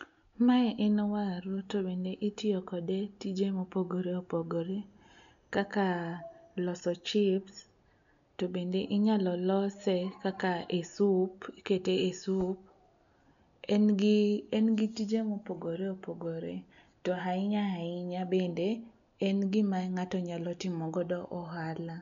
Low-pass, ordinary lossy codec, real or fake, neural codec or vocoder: 7.2 kHz; MP3, 96 kbps; real; none